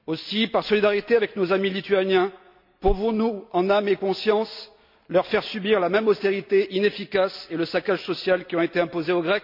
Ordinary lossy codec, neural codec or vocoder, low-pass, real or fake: MP3, 48 kbps; none; 5.4 kHz; real